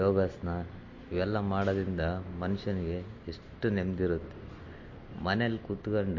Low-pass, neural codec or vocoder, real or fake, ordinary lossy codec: 7.2 kHz; none; real; MP3, 32 kbps